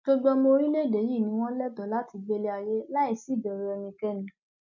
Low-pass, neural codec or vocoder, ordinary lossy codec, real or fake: 7.2 kHz; none; none; real